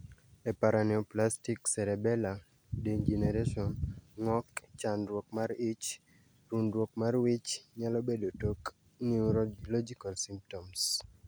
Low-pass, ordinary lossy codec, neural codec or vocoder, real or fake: none; none; none; real